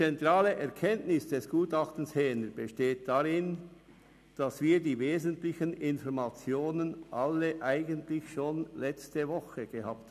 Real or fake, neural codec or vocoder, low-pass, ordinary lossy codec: real; none; 14.4 kHz; none